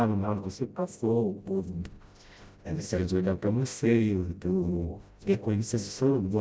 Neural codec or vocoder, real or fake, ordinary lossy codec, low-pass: codec, 16 kHz, 0.5 kbps, FreqCodec, smaller model; fake; none; none